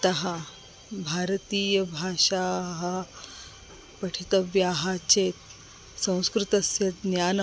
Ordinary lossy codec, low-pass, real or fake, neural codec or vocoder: none; none; real; none